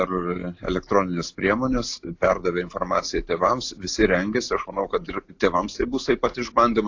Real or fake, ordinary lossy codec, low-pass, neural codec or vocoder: real; AAC, 48 kbps; 7.2 kHz; none